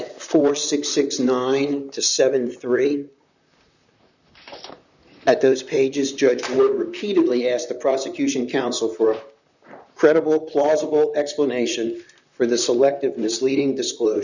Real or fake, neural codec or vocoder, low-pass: fake; vocoder, 44.1 kHz, 128 mel bands, Pupu-Vocoder; 7.2 kHz